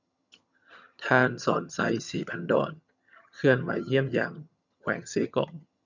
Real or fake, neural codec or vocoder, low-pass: fake; vocoder, 22.05 kHz, 80 mel bands, HiFi-GAN; 7.2 kHz